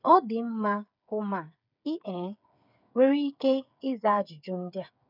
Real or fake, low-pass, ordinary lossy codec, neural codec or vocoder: fake; 5.4 kHz; none; codec, 16 kHz, 8 kbps, FreqCodec, smaller model